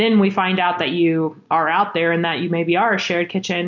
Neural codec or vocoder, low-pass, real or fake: none; 7.2 kHz; real